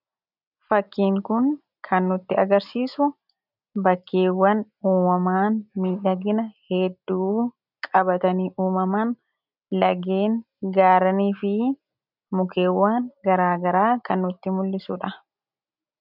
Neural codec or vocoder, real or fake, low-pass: none; real; 5.4 kHz